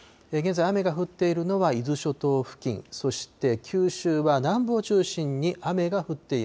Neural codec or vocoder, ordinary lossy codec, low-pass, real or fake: none; none; none; real